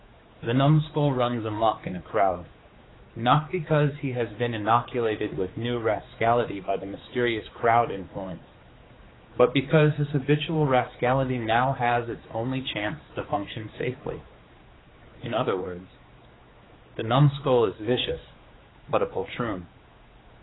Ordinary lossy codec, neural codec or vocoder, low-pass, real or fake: AAC, 16 kbps; codec, 16 kHz, 4 kbps, X-Codec, HuBERT features, trained on general audio; 7.2 kHz; fake